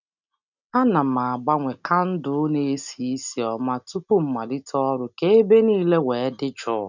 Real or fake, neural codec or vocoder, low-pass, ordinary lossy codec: real; none; 7.2 kHz; none